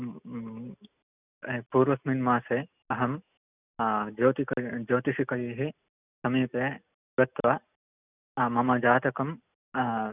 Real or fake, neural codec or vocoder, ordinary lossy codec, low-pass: real; none; none; 3.6 kHz